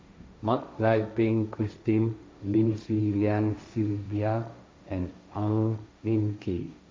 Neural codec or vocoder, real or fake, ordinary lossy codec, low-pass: codec, 16 kHz, 1.1 kbps, Voila-Tokenizer; fake; none; none